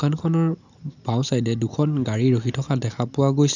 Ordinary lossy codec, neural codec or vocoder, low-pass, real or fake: none; none; 7.2 kHz; real